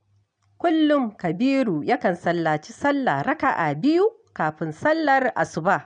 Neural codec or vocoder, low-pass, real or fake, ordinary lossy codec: none; 9.9 kHz; real; MP3, 64 kbps